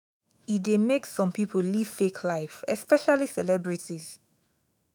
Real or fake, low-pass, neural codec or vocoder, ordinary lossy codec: fake; none; autoencoder, 48 kHz, 128 numbers a frame, DAC-VAE, trained on Japanese speech; none